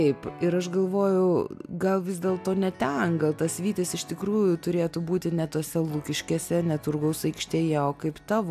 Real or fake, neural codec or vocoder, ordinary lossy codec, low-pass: real; none; AAC, 96 kbps; 14.4 kHz